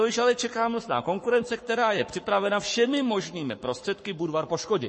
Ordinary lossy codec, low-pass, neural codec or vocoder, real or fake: MP3, 32 kbps; 10.8 kHz; codec, 44.1 kHz, 7.8 kbps, Pupu-Codec; fake